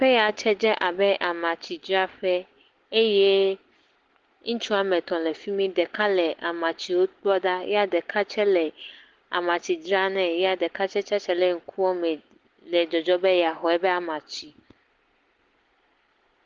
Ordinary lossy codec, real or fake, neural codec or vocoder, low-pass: Opus, 16 kbps; real; none; 7.2 kHz